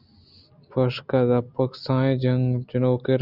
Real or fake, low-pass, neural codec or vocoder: real; 5.4 kHz; none